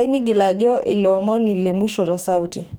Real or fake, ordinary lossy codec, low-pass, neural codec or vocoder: fake; none; none; codec, 44.1 kHz, 2.6 kbps, DAC